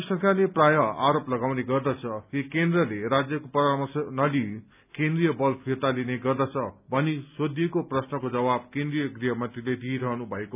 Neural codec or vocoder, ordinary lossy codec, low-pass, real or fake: none; none; 3.6 kHz; real